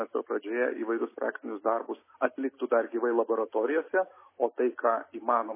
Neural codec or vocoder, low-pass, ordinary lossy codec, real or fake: none; 3.6 kHz; MP3, 16 kbps; real